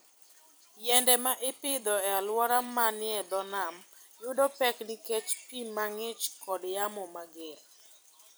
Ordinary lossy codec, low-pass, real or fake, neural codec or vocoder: none; none; fake; vocoder, 44.1 kHz, 128 mel bands every 256 samples, BigVGAN v2